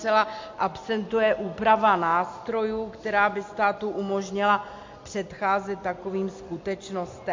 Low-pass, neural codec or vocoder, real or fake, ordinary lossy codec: 7.2 kHz; none; real; MP3, 48 kbps